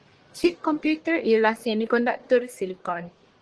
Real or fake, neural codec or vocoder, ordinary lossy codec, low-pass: fake; codec, 44.1 kHz, 1.7 kbps, Pupu-Codec; Opus, 16 kbps; 10.8 kHz